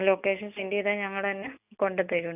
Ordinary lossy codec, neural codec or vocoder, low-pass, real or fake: none; none; 3.6 kHz; real